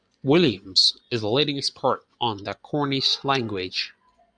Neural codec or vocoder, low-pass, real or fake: vocoder, 24 kHz, 100 mel bands, Vocos; 9.9 kHz; fake